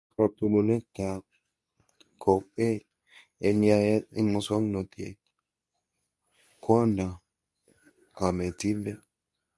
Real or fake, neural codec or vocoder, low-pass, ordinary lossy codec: fake; codec, 24 kHz, 0.9 kbps, WavTokenizer, medium speech release version 2; none; none